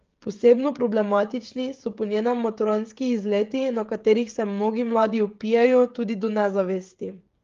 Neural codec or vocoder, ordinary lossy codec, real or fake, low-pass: codec, 16 kHz, 16 kbps, FreqCodec, smaller model; Opus, 32 kbps; fake; 7.2 kHz